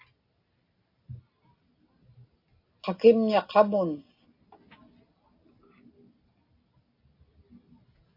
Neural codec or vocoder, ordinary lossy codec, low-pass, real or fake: none; AAC, 32 kbps; 5.4 kHz; real